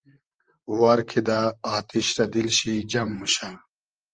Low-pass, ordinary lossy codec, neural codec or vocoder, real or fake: 9.9 kHz; Opus, 24 kbps; vocoder, 44.1 kHz, 128 mel bands, Pupu-Vocoder; fake